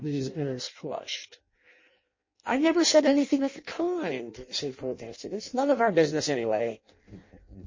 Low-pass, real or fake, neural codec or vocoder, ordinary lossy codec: 7.2 kHz; fake; codec, 16 kHz in and 24 kHz out, 0.6 kbps, FireRedTTS-2 codec; MP3, 32 kbps